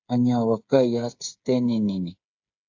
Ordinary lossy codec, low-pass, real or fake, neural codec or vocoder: AAC, 48 kbps; 7.2 kHz; fake; codec, 16 kHz, 8 kbps, FreqCodec, smaller model